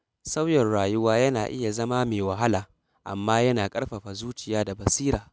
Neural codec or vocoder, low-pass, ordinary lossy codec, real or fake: none; none; none; real